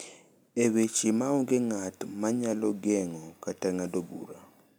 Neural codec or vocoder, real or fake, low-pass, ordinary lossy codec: none; real; none; none